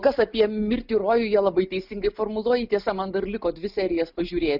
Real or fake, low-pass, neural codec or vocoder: real; 5.4 kHz; none